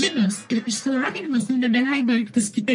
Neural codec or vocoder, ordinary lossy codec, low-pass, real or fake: codec, 44.1 kHz, 1.7 kbps, Pupu-Codec; MP3, 48 kbps; 10.8 kHz; fake